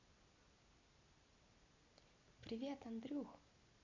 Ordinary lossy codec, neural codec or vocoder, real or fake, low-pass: MP3, 64 kbps; none; real; 7.2 kHz